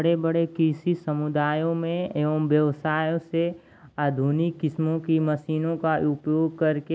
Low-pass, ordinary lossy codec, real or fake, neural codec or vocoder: none; none; real; none